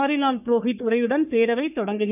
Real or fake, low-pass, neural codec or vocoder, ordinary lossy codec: fake; 3.6 kHz; codec, 44.1 kHz, 3.4 kbps, Pupu-Codec; none